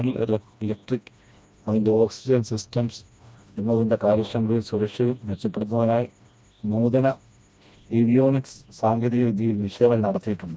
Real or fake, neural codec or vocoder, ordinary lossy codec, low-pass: fake; codec, 16 kHz, 1 kbps, FreqCodec, smaller model; none; none